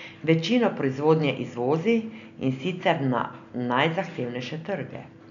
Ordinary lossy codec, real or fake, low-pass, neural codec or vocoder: none; real; 7.2 kHz; none